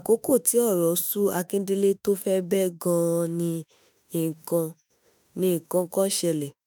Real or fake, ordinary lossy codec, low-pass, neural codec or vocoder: fake; none; none; autoencoder, 48 kHz, 32 numbers a frame, DAC-VAE, trained on Japanese speech